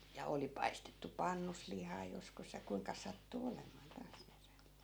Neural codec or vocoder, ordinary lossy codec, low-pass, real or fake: none; none; none; real